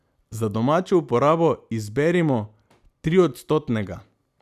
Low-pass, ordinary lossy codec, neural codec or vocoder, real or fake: 14.4 kHz; none; none; real